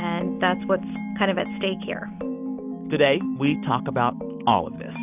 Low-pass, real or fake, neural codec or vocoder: 3.6 kHz; real; none